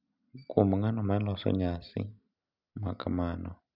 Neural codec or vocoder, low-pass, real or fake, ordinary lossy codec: none; 5.4 kHz; real; none